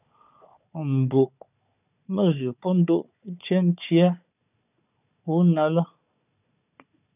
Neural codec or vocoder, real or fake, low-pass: codec, 16 kHz, 4 kbps, FunCodec, trained on Chinese and English, 50 frames a second; fake; 3.6 kHz